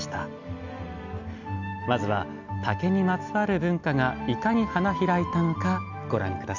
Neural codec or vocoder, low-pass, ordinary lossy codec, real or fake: none; 7.2 kHz; none; real